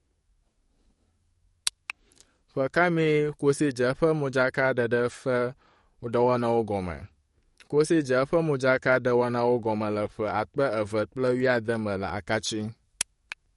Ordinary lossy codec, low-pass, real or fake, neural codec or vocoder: MP3, 48 kbps; 19.8 kHz; fake; codec, 44.1 kHz, 7.8 kbps, DAC